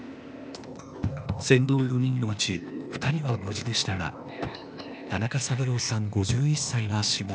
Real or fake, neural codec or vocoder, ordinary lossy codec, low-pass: fake; codec, 16 kHz, 0.8 kbps, ZipCodec; none; none